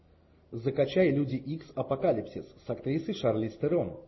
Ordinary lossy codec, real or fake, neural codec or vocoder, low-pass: MP3, 24 kbps; real; none; 7.2 kHz